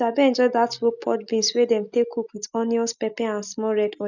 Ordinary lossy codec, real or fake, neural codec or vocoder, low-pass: none; real; none; 7.2 kHz